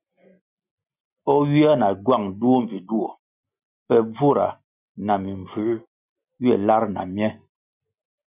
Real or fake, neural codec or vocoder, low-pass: real; none; 3.6 kHz